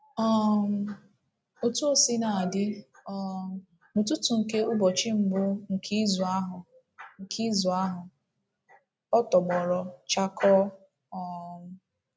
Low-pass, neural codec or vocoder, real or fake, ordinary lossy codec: none; none; real; none